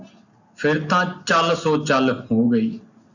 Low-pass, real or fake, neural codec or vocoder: 7.2 kHz; real; none